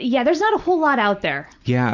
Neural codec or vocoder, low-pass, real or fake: none; 7.2 kHz; real